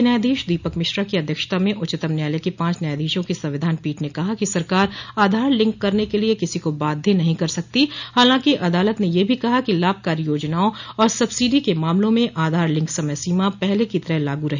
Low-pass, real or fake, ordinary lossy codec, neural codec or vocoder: 7.2 kHz; real; none; none